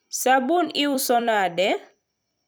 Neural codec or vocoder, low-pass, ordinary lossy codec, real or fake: vocoder, 44.1 kHz, 128 mel bands every 256 samples, BigVGAN v2; none; none; fake